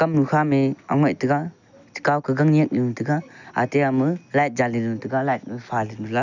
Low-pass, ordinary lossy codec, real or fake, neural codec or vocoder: 7.2 kHz; none; real; none